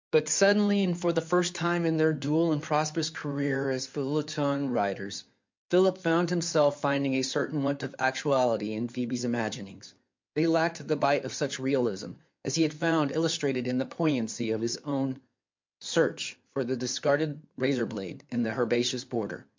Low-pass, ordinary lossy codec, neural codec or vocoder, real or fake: 7.2 kHz; MP3, 64 kbps; codec, 16 kHz in and 24 kHz out, 2.2 kbps, FireRedTTS-2 codec; fake